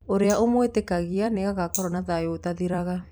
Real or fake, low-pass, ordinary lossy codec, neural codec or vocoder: fake; none; none; vocoder, 44.1 kHz, 128 mel bands every 256 samples, BigVGAN v2